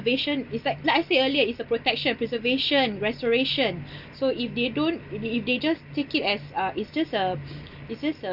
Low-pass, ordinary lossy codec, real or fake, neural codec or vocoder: 5.4 kHz; none; real; none